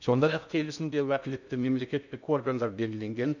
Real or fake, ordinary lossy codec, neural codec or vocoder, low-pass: fake; none; codec, 16 kHz in and 24 kHz out, 0.6 kbps, FocalCodec, streaming, 2048 codes; 7.2 kHz